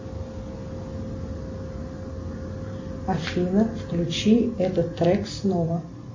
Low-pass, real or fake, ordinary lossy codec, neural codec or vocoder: 7.2 kHz; real; MP3, 32 kbps; none